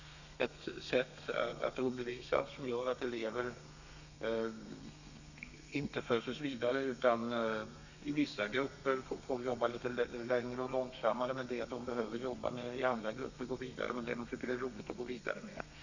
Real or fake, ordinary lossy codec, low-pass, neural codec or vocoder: fake; none; 7.2 kHz; codec, 32 kHz, 1.9 kbps, SNAC